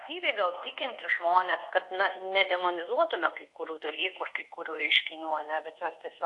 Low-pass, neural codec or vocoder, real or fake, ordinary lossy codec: 10.8 kHz; codec, 24 kHz, 1.2 kbps, DualCodec; fake; AAC, 48 kbps